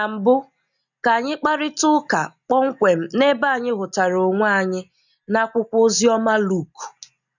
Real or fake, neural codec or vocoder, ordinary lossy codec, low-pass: real; none; none; 7.2 kHz